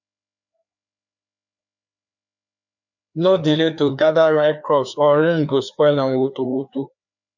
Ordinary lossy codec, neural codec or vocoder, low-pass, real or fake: none; codec, 16 kHz, 2 kbps, FreqCodec, larger model; 7.2 kHz; fake